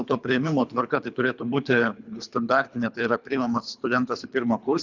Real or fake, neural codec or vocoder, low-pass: fake; codec, 24 kHz, 3 kbps, HILCodec; 7.2 kHz